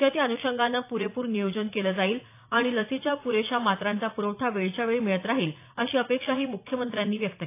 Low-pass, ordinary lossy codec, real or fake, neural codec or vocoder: 3.6 kHz; AAC, 24 kbps; fake; vocoder, 44.1 kHz, 80 mel bands, Vocos